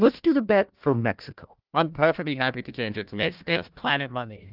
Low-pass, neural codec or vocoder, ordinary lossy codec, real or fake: 5.4 kHz; codec, 16 kHz, 1 kbps, FunCodec, trained on Chinese and English, 50 frames a second; Opus, 32 kbps; fake